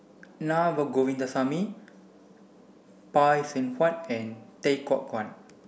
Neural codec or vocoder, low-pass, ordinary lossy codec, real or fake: none; none; none; real